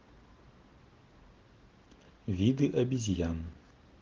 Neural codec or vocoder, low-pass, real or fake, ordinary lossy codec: none; 7.2 kHz; real; Opus, 16 kbps